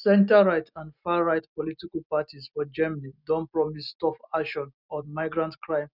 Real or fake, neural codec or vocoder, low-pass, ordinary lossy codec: real; none; 5.4 kHz; none